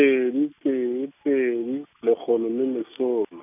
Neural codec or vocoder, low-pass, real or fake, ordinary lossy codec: none; 3.6 kHz; real; none